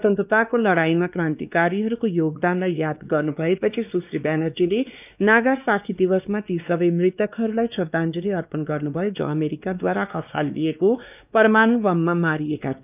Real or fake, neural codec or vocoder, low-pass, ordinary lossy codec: fake; codec, 16 kHz, 2 kbps, X-Codec, WavLM features, trained on Multilingual LibriSpeech; 3.6 kHz; none